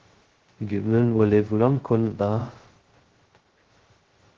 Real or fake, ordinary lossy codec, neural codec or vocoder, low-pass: fake; Opus, 16 kbps; codec, 16 kHz, 0.2 kbps, FocalCodec; 7.2 kHz